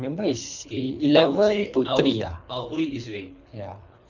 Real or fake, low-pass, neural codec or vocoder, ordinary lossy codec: fake; 7.2 kHz; codec, 24 kHz, 3 kbps, HILCodec; none